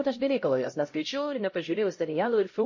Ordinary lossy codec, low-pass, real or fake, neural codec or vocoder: MP3, 32 kbps; 7.2 kHz; fake; codec, 16 kHz, 0.5 kbps, X-Codec, HuBERT features, trained on LibriSpeech